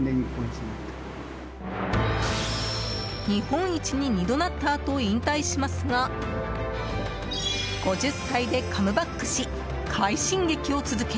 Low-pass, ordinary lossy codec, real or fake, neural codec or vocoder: none; none; real; none